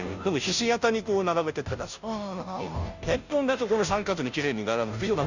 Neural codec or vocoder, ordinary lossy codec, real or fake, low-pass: codec, 16 kHz, 0.5 kbps, FunCodec, trained on Chinese and English, 25 frames a second; none; fake; 7.2 kHz